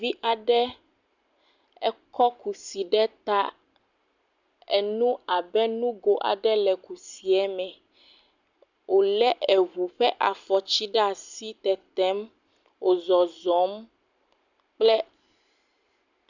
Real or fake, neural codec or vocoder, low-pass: real; none; 7.2 kHz